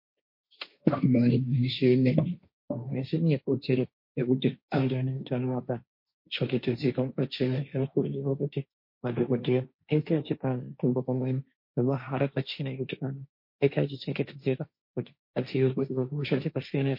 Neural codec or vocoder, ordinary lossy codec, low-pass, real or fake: codec, 16 kHz, 1.1 kbps, Voila-Tokenizer; MP3, 32 kbps; 5.4 kHz; fake